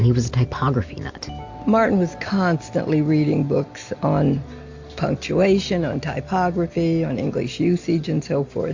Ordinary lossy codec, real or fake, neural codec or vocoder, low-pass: AAC, 48 kbps; real; none; 7.2 kHz